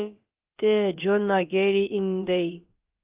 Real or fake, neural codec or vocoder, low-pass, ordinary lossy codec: fake; codec, 16 kHz, about 1 kbps, DyCAST, with the encoder's durations; 3.6 kHz; Opus, 32 kbps